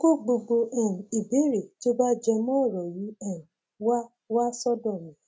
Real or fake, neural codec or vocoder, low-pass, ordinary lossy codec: real; none; none; none